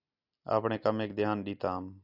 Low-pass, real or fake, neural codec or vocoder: 5.4 kHz; real; none